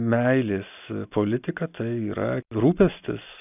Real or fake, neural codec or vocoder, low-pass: real; none; 3.6 kHz